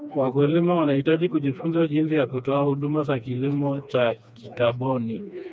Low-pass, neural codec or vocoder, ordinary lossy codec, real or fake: none; codec, 16 kHz, 2 kbps, FreqCodec, smaller model; none; fake